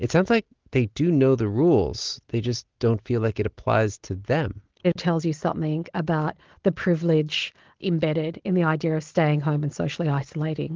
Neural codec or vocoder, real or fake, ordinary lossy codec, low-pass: none; real; Opus, 16 kbps; 7.2 kHz